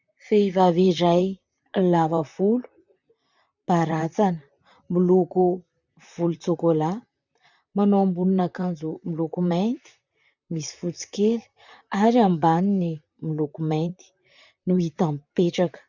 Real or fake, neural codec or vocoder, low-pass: fake; vocoder, 44.1 kHz, 128 mel bands, Pupu-Vocoder; 7.2 kHz